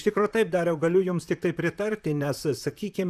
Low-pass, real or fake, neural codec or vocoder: 14.4 kHz; fake; vocoder, 44.1 kHz, 128 mel bands, Pupu-Vocoder